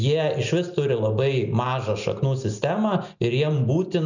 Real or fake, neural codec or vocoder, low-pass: real; none; 7.2 kHz